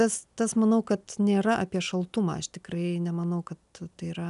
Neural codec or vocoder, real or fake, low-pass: none; real; 10.8 kHz